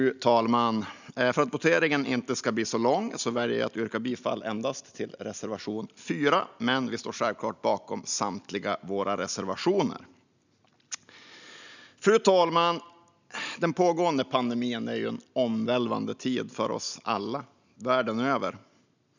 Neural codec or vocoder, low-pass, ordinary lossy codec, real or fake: none; 7.2 kHz; none; real